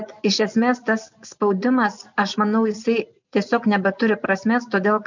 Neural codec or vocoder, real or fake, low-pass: none; real; 7.2 kHz